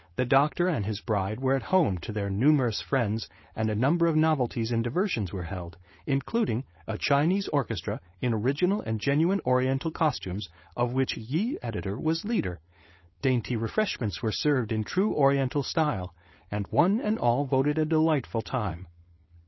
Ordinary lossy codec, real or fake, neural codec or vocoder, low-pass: MP3, 24 kbps; fake; codec, 16 kHz, 4.8 kbps, FACodec; 7.2 kHz